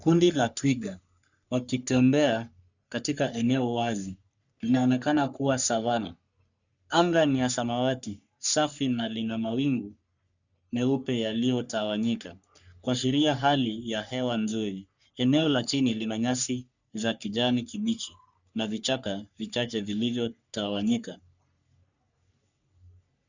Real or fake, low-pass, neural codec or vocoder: fake; 7.2 kHz; codec, 44.1 kHz, 3.4 kbps, Pupu-Codec